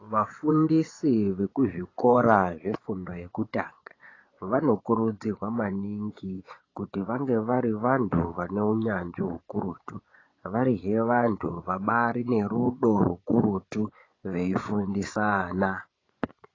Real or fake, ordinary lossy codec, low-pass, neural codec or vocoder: fake; AAC, 32 kbps; 7.2 kHz; vocoder, 24 kHz, 100 mel bands, Vocos